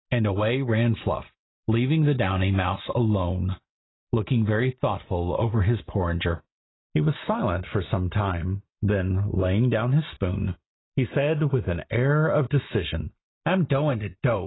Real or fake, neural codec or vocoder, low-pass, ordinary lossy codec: real; none; 7.2 kHz; AAC, 16 kbps